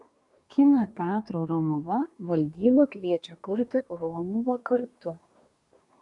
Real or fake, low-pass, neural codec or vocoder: fake; 10.8 kHz; codec, 24 kHz, 1 kbps, SNAC